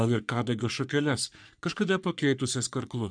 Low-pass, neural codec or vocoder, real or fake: 9.9 kHz; codec, 44.1 kHz, 3.4 kbps, Pupu-Codec; fake